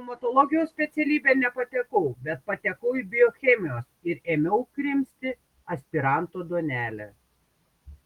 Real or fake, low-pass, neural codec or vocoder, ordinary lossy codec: real; 19.8 kHz; none; Opus, 24 kbps